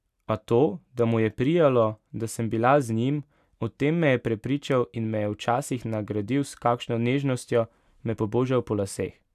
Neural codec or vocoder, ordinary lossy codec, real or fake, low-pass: none; none; real; 14.4 kHz